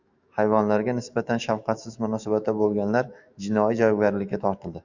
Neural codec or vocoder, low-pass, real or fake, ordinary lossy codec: autoencoder, 48 kHz, 128 numbers a frame, DAC-VAE, trained on Japanese speech; 7.2 kHz; fake; Opus, 64 kbps